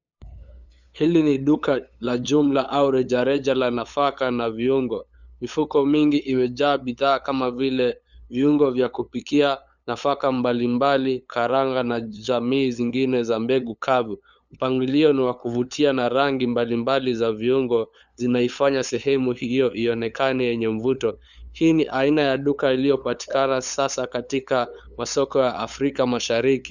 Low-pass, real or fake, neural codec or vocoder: 7.2 kHz; fake; codec, 16 kHz, 8 kbps, FunCodec, trained on LibriTTS, 25 frames a second